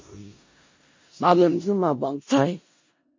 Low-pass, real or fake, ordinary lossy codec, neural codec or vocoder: 7.2 kHz; fake; MP3, 32 kbps; codec, 16 kHz in and 24 kHz out, 0.4 kbps, LongCat-Audio-Codec, four codebook decoder